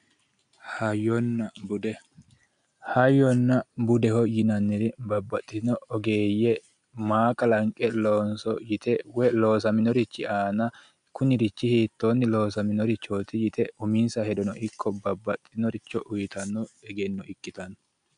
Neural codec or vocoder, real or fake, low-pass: none; real; 9.9 kHz